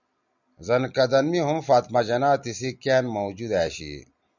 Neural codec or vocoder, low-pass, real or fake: none; 7.2 kHz; real